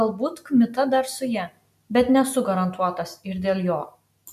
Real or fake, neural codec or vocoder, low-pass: real; none; 14.4 kHz